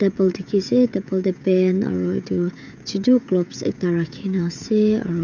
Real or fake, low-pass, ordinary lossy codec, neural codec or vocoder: fake; 7.2 kHz; none; codec, 16 kHz, 16 kbps, FreqCodec, smaller model